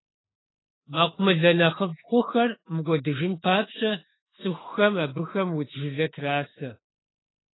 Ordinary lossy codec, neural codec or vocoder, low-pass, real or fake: AAC, 16 kbps; autoencoder, 48 kHz, 32 numbers a frame, DAC-VAE, trained on Japanese speech; 7.2 kHz; fake